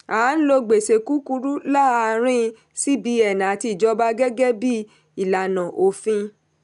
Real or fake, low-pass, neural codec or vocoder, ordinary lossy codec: real; 10.8 kHz; none; none